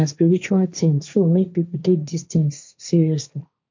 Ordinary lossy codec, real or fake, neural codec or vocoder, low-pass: MP3, 64 kbps; fake; codec, 16 kHz, 1.1 kbps, Voila-Tokenizer; 7.2 kHz